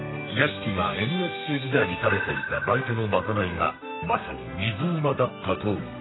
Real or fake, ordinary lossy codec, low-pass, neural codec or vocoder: fake; AAC, 16 kbps; 7.2 kHz; codec, 32 kHz, 1.9 kbps, SNAC